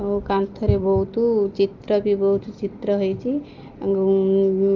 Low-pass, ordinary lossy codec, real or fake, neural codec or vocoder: 7.2 kHz; Opus, 24 kbps; real; none